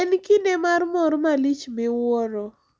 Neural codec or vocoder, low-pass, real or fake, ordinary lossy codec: none; none; real; none